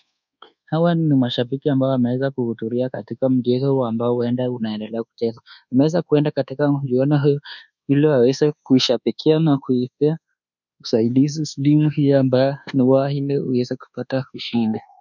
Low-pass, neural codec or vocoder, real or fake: 7.2 kHz; codec, 24 kHz, 1.2 kbps, DualCodec; fake